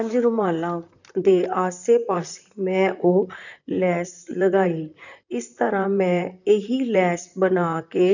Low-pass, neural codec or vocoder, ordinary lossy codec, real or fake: 7.2 kHz; vocoder, 44.1 kHz, 128 mel bands, Pupu-Vocoder; none; fake